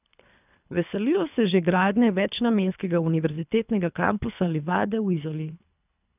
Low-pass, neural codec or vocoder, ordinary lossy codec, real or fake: 3.6 kHz; codec, 24 kHz, 3 kbps, HILCodec; none; fake